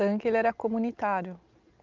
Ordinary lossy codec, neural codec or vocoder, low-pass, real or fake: Opus, 24 kbps; none; 7.2 kHz; real